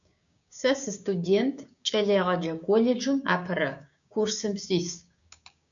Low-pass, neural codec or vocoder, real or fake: 7.2 kHz; codec, 16 kHz, 6 kbps, DAC; fake